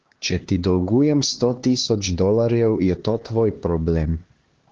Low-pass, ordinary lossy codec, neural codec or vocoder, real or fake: 7.2 kHz; Opus, 16 kbps; codec, 16 kHz, 2 kbps, X-Codec, HuBERT features, trained on LibriSpeech; fake